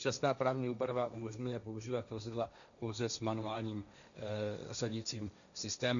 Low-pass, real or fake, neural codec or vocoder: 7.2 kHz; fake; codec, 16 kHz, 1.1 kbps, Voila-Tokenizer